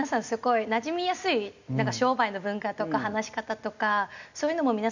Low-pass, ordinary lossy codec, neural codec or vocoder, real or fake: 7.2 kHz; none; none; real